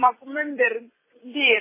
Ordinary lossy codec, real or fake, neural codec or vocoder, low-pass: MP3, 16 kbps; fake; vocoder, 44.1 kHz, 128 mel bands every 256 samples, BigVGAN v2; 3.6 kHz